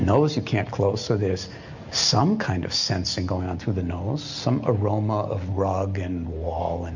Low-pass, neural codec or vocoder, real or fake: 7.2 kHz; none; real